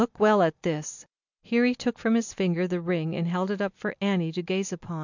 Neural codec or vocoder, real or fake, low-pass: none; real; 7.2 kHz